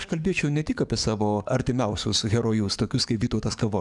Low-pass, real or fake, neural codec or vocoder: 10.8 kHz; fake; codec, 44.1 kHz, 7.8 kbps, DAC